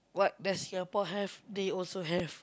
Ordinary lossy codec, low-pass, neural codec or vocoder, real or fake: none; none; none; real